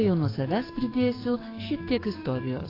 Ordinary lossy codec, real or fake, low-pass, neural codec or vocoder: AAC, 24 kbps; fake; 5.4 kHz; codec, 44.1 kHz, 7.8 kbps, DAC